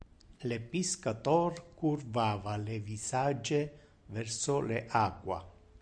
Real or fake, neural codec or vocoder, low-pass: real; none; 9.9 kHz